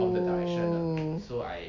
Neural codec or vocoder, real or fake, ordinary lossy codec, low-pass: none; real; AAC, 48 kbps; 7.2 kHz